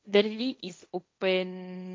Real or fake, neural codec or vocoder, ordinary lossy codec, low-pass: fake; codec, 16 kHz, 1.1 kbps, Voila-Tokenizer; none; none